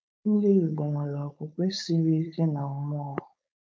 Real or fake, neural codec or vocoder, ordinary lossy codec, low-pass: fake; codec, 16 kHz, 4.8 kbps, FACodec; none; none